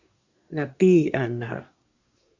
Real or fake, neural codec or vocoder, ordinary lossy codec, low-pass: fake; codec, 24 kHz, 1 kbps, SNAC; Opus, 64 kbps; 7.2 kHz